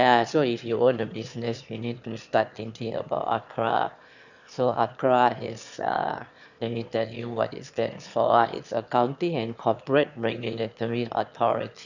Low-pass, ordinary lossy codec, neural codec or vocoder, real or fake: 7.2 kHz; none; autoencoder, 22.05 kHz, a latent of 192 numbers a frame, VITS, trained on one speaker; fake